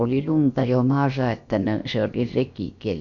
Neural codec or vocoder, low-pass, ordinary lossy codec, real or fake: codec, 16 kHz, about 1 kbps, DyCAST, with the encoder's durations; 7.2 kHz; MP3, 96 kbps; fake